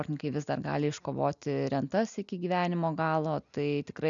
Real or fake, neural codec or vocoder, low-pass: real; none; 7.2 kHz